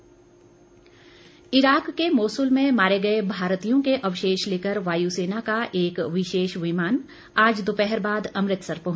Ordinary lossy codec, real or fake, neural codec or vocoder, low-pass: none; real; none; none